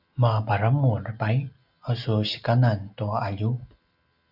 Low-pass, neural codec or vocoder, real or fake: 5.4 kHz; none; real